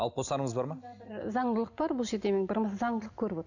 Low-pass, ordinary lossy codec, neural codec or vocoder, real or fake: 7.2 kHz; none; none; real